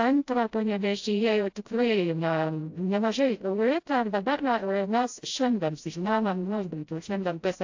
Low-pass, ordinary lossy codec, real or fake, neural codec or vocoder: 7.2 kHz; AAC, 48 kbps; fake; codec, 16 kHz, 0.5 kbps, FreqCodec, smaller model